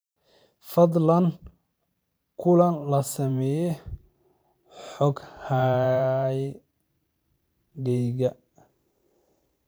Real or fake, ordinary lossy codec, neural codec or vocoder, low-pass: fake; none; vocoder, 44.1 kHz, 128 mel bands every 512 samples, BigVGAN v2; none